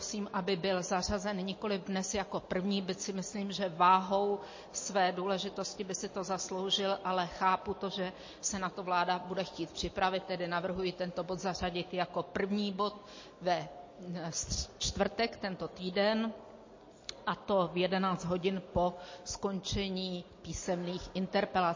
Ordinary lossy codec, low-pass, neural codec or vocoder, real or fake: MP3, 32 kbps; 7.2 kHz; none; real